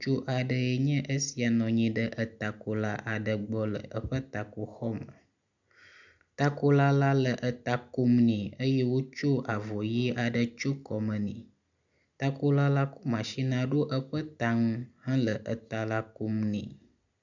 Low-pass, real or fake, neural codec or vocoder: 7.2 kHz; real; none